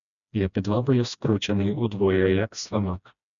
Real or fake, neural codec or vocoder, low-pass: fake; codec, 16 kHz, 1 kbps, FreqCodec, smaller model; 7.2 kHz